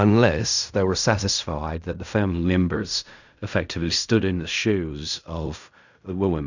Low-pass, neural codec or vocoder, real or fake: 7.2 kHz; codec, 16 kHz in and 24 kHz out, 0.4 kbps, LongCat-Audio-Codec, fine tuned four codebook decoder; fake